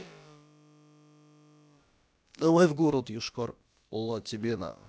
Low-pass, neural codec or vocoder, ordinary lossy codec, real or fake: none; codec, 16 kHz, about 1 kbps, DyCAST, with the encoder's durations; none; fake